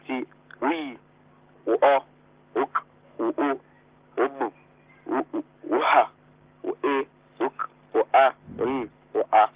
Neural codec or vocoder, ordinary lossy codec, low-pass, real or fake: none; Opus, 16 kbps; 3.6 kHz; real